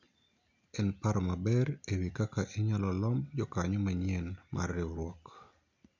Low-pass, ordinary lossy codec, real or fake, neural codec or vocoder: 7.2 kHz; none; real; none